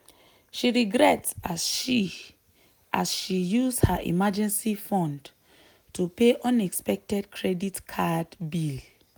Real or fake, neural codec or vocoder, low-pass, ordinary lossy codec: real; none; none; none